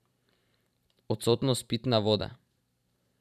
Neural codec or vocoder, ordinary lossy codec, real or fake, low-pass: vocoder, 44.1 kHz, 128 mel bands every 512 samples, BigVGAN v2; none; fake; 14.4 kHz